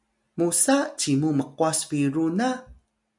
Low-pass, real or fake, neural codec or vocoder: 10.8 kHz; real; none